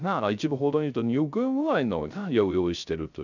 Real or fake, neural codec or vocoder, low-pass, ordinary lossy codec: fake; codec, 16 kHz, 0.3 kbps, FocalCodec; 7.2 kHz; none